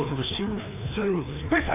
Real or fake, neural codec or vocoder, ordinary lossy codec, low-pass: fake; codec, 16 kHz, 2 kbps, FreqCodec, larger model; AAC, 24 kbps; 3.6 kHz